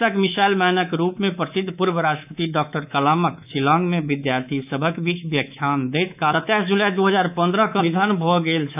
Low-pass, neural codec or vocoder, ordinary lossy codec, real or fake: 3.6 kHz; codec, 24 kHz, 3.1 kbps, DualCodec; none; fake